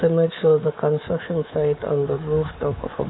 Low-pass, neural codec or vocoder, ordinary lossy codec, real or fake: 7.2 kHz; codec, 24 kHz, 3.1 kbps, DualCodec; AAC, 16 kbps; fake